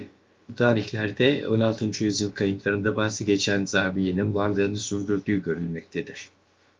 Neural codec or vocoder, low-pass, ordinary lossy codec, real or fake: codec, 16 kHz, about 1 kbps, DyCAST, with the encoder's durations; 7.2 kHz; Opus, 32 kbps; fake